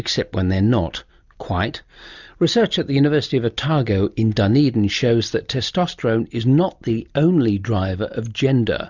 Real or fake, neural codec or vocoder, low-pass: real; none; 7.2 kHz